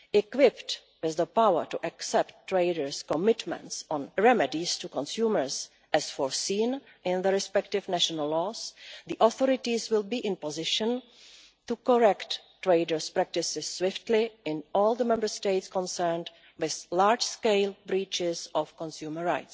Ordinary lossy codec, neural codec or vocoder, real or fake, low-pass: none; none; real; none